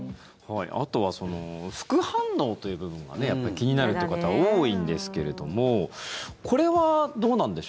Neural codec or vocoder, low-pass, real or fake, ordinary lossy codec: none; none; real; none